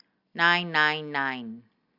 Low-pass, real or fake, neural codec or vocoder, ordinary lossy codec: 5.4 kHz; real; none; Opus, 64 kbps